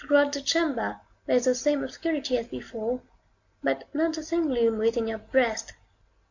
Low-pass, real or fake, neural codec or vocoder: 7.2 kHz; real; none